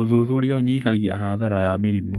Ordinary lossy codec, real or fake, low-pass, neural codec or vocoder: none; fake; 14.4 kHz; codec, 32 kHz, 1.9 kbps, SNAC